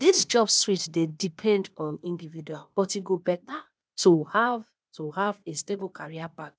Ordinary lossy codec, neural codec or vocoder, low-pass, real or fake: none; codec, 16 kHz, 0.8 kbps, ZipCodec; none; fake